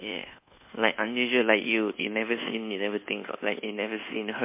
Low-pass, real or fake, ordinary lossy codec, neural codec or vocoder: 3.6 kHz; fake; MP3, 24 kbps; codec, 24 kHz, 1.2 kbps, DualCodec